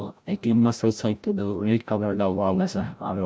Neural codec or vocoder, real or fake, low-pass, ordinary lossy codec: codec, 16 kHz, 0.5 kbps, FreqCodec, larger model; fake; none; none